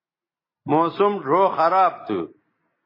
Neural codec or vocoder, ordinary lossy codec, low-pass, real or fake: none; MP3, 24 kbps; 5.4 kHz; real